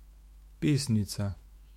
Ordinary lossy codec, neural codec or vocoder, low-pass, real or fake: MP3, 64 kbps; autoencoder, 48 kHz, 128 numbers a frame, DAC-VAE, trained on Japanese speech; 19.8 kHz; fake